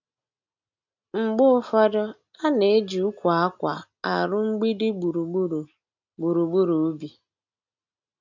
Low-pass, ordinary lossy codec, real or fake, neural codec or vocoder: 7.2 kHz; none; real; none